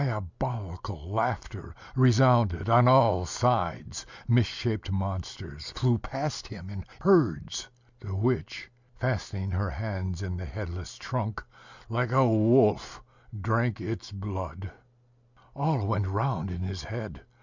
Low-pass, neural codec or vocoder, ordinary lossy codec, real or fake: 7.2 kHz; none; Opus, 64 kbps; real